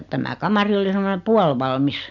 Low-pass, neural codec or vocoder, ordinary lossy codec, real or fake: 7.2 kHz; none; none; real